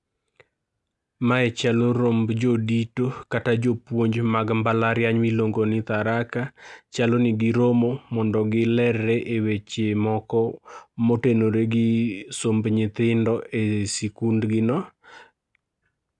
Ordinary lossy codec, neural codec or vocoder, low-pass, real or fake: none; none; 10.8 kHz; real